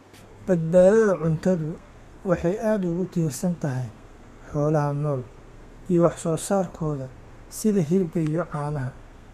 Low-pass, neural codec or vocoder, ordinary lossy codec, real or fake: 14.4 kHz; codec, 32 kHz, 1.9 kbps, SNAC; none; fake